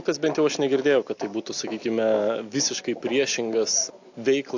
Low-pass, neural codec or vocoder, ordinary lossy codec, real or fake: 7.2 kHz; none; AAC, 48 kbps; real